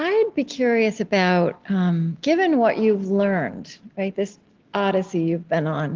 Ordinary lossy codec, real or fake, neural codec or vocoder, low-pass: Opus, 16 kbps; real; none; 7.2 kHz